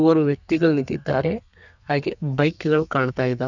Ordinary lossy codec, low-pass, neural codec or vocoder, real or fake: none; 7.2 kHz; codec, 32 kHz, 1.9 kbps, SNAC; fake